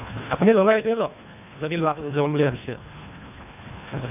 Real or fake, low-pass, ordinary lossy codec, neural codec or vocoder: fake; 3.6 kHz; none; codec, 24 kHz, 1.5 kbps, HILCodec